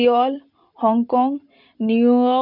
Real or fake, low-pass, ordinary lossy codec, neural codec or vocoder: real; 5.4 kHz; none; none